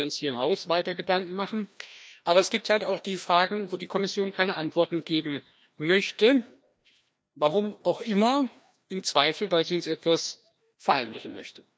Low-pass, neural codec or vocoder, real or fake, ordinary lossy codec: none; codec, 16 kHz, 1 kbps, FreqCodec, larger model; fake; none